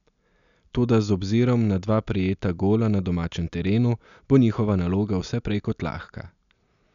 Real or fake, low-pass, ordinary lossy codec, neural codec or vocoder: real; 7.2 kHz; none; none